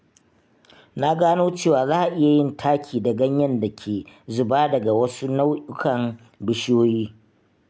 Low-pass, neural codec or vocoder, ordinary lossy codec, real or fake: none; none; none; real